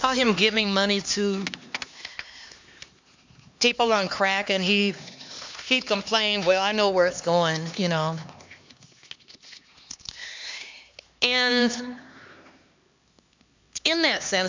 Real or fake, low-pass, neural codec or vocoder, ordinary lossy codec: fake; 7.2 kHz; codec, 16 kHz, 2 kbps, X-Codec, HuBERT features, trained on LibriSpeech; MP3, 64 kbps